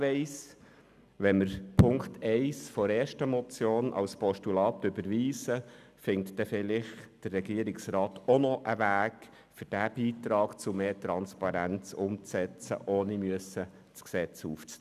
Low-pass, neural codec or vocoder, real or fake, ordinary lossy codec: 14.4 kHz; none; real; none